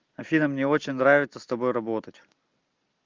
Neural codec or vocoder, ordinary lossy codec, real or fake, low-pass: none; Opus, 24 kbps; real; 7.2 kHz